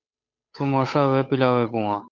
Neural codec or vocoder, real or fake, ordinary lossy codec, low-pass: codec, 16 kHz, 8 kbps, FunCodec, trained on Chinese and English, 25 frames a second; fake; MP3, 64 kbps; 7.2 kHz